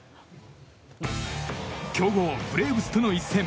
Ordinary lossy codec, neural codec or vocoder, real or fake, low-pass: none; none; real; none